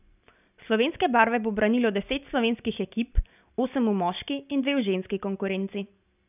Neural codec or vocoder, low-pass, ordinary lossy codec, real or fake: none; 3.6 kHz; none; real